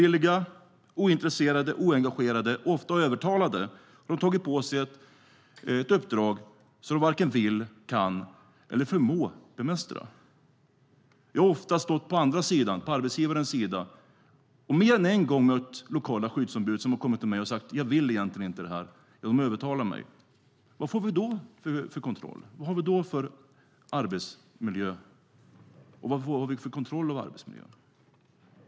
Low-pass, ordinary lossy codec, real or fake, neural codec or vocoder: none; none; real; none